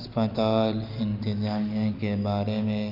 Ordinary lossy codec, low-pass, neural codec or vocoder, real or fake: Opus, 24 kbps; 5.4 kHz; none; real